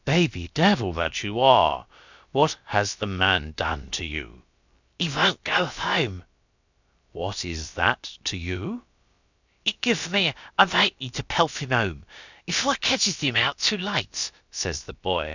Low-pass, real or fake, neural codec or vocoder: 7.2 kHz; fake; codec, 16 kHz, about 1 kbps, DyCAST, with the encoder's durations